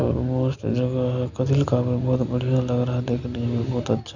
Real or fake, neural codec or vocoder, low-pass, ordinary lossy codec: real; none; 7.2 kHz; none